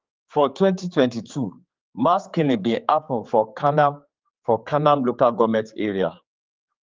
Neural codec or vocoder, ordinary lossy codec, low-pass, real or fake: codec, 16 kHz, 4 kbps, X-Codec, HuBERT features, trained on general audio; Opus, 24 kbps; 7.2 kHz; fake